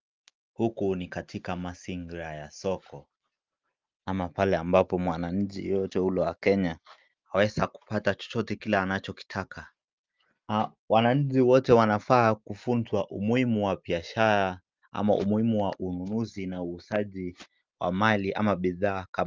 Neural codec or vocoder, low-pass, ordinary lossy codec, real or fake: none; 7.2 kHz; Opus, 32 kbps; real